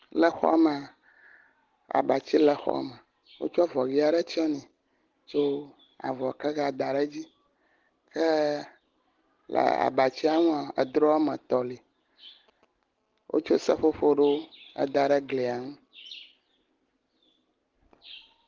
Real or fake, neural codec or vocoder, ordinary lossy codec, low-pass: real; none; Opus, 16 kbps; 7.2 kHz